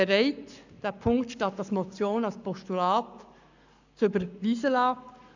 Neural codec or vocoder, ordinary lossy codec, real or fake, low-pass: codec, 44.1 kHz, 7.8 kbps, Pupu-Codec; none; fake; 7.2 kHz